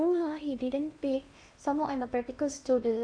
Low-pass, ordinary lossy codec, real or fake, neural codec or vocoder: 9.9 kHz; none; fake; codec, 16 kHz in and 24 kHz out, 0.8 kbps, FocalCodec, streaming, 65536 codes